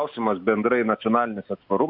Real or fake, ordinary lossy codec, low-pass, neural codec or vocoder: real; AAC, 48 kbps; 5.4 kHz; none